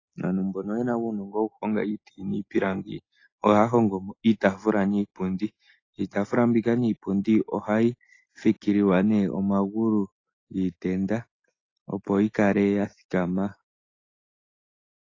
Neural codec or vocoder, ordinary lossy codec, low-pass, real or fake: none; AAC, 32 kbps; 7.2 kHz; real